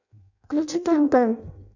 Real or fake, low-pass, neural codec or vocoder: fake; 7.2 kHz; codec, 16 kHz in and 24 kHz out, 0.6 kbps, FireRedTTS-2 codec